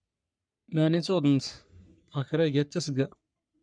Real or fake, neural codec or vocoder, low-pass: fake; codec, 44.1 kHz, 3.4 kbps, Pupu-Codec; 9.9 kHz